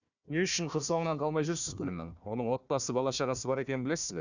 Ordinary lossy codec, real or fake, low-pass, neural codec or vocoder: none; fake; 7.2 kHz; codec, 16 kHz, 1 kbps, FunCodec, trained on Chinese and English, 50 frames a second